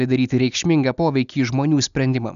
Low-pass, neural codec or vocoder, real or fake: 7.2 kHz; none; real